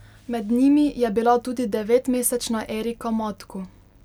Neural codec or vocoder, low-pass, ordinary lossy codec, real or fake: none; 19.8 kHz; none; real